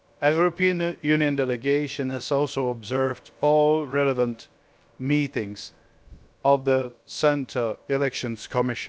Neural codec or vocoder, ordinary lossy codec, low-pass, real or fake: codec, 16 kHz, about 1 kbps, DyCAST, with the encoder's durations; none; none; fake